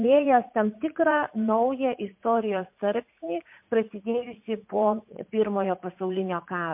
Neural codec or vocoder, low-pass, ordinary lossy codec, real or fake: vocoder, 44.1 kHz, 80 mel bands, Vocos; 3.6 kHz; MP3, 32 kbps; fake